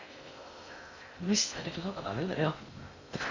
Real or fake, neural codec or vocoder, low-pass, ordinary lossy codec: fake; codec, 16 kHz in and 24 kHz out, 0.6 kbps, FocalCodec, streaming, 2048 codes; 7.2 kHz; MP3, 64 kbps